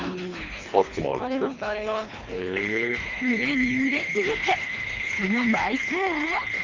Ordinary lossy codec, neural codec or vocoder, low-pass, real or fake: Opus, 32 kbps; codec, 24 kHz, 3 kbps, HILCodec; 7.2 kHz; fake